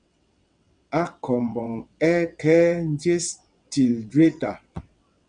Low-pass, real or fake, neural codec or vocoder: 9.9 kHz; fake; vocoder, 22.05 kHz, 80 mel bands, WaveNeXt